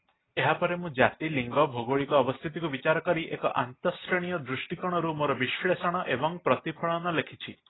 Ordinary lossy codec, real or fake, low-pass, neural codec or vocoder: AAC, 16 kbps; real; 7.2 kHz; none